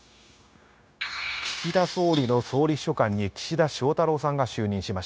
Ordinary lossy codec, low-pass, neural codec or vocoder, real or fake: none; none; codec, 16 kHz, 0.9 kbps, LongCat-Audio-Codec; fake